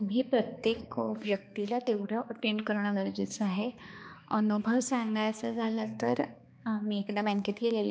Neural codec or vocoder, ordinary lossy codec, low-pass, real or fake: codec, 16 kHz, 2 kbps, X-Codec, HuBERT features, trained on balanced general audio; none; none; fake